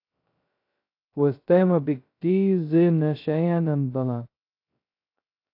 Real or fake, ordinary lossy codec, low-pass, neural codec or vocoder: fake; AAC, 32 kbps; 5.4 kHz; codec, 16 kHz, 0.2 kbps, FocalCodec